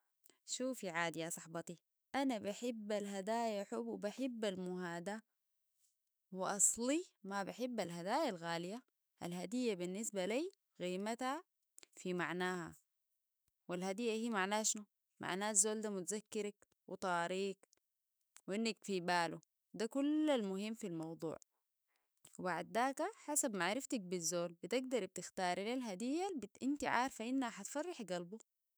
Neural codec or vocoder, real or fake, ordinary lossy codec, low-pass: autoencoder, 48 kHz, 128 numbers a frame, DAC-VAE, trained on Japanese speech; fake; none; none